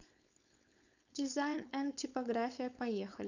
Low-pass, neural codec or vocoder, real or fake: 7.2 kHz; codec, 16 kHz, 4.8 kbps, FACodec; fake